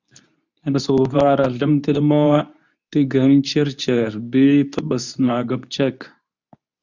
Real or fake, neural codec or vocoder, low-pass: fake; codec, 24 kHz, 0.9 kbps, WavTokenizer, medium speech release version 2; 7.2 kHz